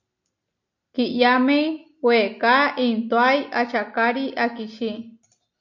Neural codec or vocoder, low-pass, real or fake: none; 7.2 kHz; real